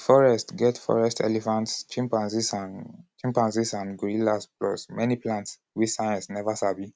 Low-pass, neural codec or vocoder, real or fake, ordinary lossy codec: none; none; real; none